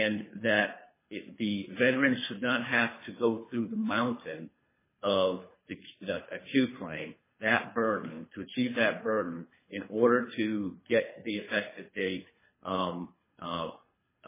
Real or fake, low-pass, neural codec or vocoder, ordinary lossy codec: fake; 3.6 kHz; codec, 24 kHz, 3 kbps, HILCodec; MP3, 16 kbps